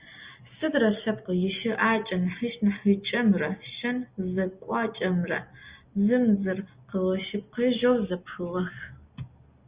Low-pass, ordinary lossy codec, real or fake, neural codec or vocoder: 3.6 kHz; Opus, 64 kbps; real; none